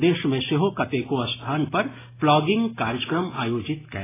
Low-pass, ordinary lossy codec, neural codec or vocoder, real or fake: 3.6 kHz; AAC, 16 kbps; none; real